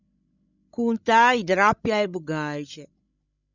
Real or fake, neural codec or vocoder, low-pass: fake; codec, 16 kHz, 16 kbps, FreqCodec, larger model; 7.2 kHz